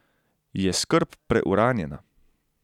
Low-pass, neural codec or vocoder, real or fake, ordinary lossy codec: 19.8 kHz; none; real; none